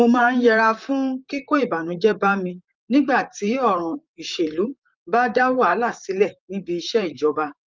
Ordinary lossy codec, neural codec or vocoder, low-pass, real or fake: Opus, 24 kbps; vocoder, 44.1 kHz, 128 mel bands, Pupu-Vocoder; 7.2 kHz; fake